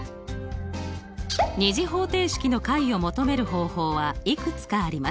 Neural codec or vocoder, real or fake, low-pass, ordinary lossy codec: none; real; none; none